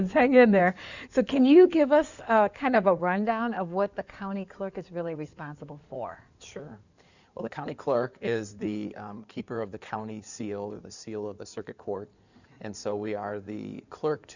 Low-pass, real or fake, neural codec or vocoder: 7.2 kHz; fake; codec, 16 kHz in and 24 kHz out, 2.2 kbps, FireRedTTS-2 codec